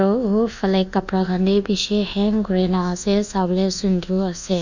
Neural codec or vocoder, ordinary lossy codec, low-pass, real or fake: codec, 24 kHz, 1.2 kbps, DualCodec; none; 7.2 kHz; fake